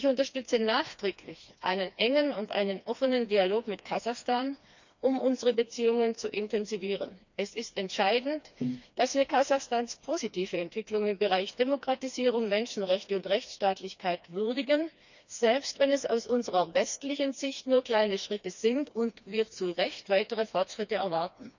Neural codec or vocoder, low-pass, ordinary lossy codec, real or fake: codec, 16 kHz, 2 kbps, FreqCodec, smaller model; 7.2 kHz; none; fake